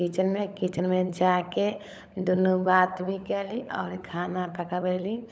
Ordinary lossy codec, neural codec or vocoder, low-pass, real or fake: none; codec, 16 kHz, 16 kbps, FunCodec, trained on LibriTTS, 50 frames a second; none; fake